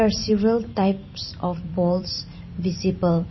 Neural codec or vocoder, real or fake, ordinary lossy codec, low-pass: vocoder, 44.1 kHz, 128 mel bands every 512 samples, BigVGAN v2; fake; MP3, 24 kbps; 7.2 kHz